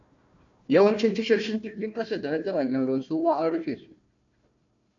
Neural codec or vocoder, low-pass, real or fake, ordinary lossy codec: codec, 16 kHz, 1 kbps, FunCodec, trained on Chinese and English, 50 frames a second; 7.2 kHz; fake; MP3, 64 kbps